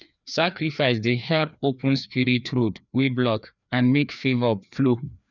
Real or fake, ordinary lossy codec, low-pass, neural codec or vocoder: fake; none; 7.2 kHz; codec, 16 kHz, 2 kbps, FreqCodec, larger model